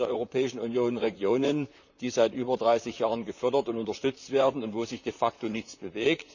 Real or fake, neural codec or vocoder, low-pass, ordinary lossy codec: fake; vocoder, 44.1 kHz, 128 mel bands, Pupu-Vocoder; 7.2 kHz; none